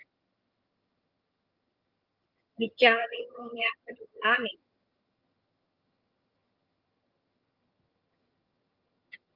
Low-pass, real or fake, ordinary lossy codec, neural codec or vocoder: 5.4 kHz; fake; Opus, 24 kbps; vocoder, 22.05 kHz, 80 mel bands, HiFi-GAN